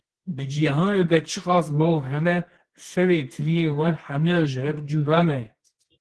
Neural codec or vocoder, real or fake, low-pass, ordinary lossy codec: codec, 24 kHz, 0.9 kbps, WavTokenizer, medium music audio release; fake; 10.8 kHz; Opus, 16 kbps